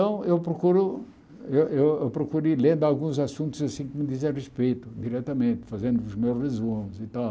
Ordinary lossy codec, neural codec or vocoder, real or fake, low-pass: none; none; real; none